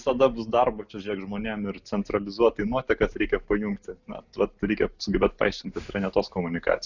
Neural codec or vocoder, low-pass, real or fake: none; 7.2 kHz; real